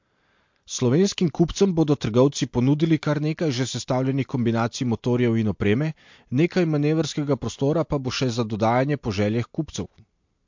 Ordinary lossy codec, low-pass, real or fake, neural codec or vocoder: MP3, 48 kbps; 7.2 kHz; real; none